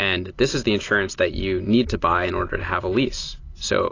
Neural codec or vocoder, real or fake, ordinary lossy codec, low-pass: none; real; AAC, 32 kbps; 7.2 kHz